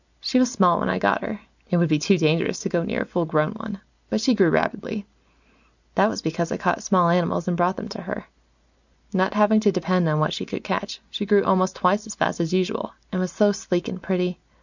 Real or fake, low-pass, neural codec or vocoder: real; 7.2 kHz; none